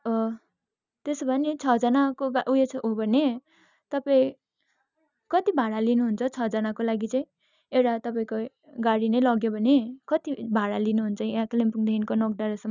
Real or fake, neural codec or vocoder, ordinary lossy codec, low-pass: real; none; none; 7.2 kHz